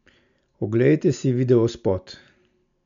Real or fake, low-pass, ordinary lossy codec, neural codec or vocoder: real; 7.2 kHz; MP3, 64 kbps; none